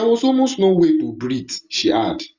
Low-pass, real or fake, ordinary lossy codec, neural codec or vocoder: 7.2 kHz; real; Opus, 64 kbps; none